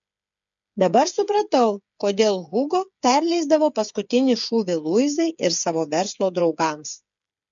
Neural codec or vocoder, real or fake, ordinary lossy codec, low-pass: codec, 16 kHz, 8 kbps, FreqCodec, smaller model; fake; MP3, 64 kbps; 7.2 kHz